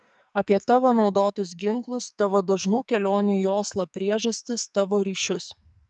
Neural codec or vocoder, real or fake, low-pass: codec, 44.1 kHz, 2.6 kbps, SNAC; fake; 10.8 kHz